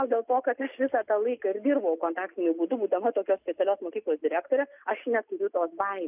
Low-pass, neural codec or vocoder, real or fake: 3.6 kHz; none; real